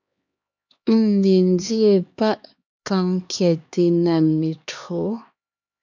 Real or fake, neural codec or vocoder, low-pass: fake; codec, 16 kHz, 2 kbps, X-Codec, HuBERT features, trained on LibriSpeech; 7.2 kHz